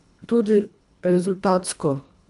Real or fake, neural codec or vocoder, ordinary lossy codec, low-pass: fake; codec, 24 kHz, 1.5 kbps, HILCodec; none; 10.8 kHz